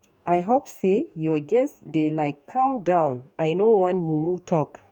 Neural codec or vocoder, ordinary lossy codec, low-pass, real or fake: codec, 44.1 kHz, 2.6 kbps, DAC; none; 19.8 kHz; fake